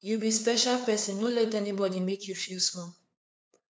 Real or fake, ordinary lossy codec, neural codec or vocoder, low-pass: fake; none; codec, 16 kHz, 2 kbps, FunCodec, trained on LibriTTS, 25 frames a second; none